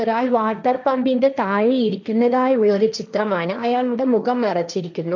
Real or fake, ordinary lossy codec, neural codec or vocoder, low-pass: fake; none; codec, 16 kHz, 1.1 kbps, Voila-Tokenizer; 7.2 kHz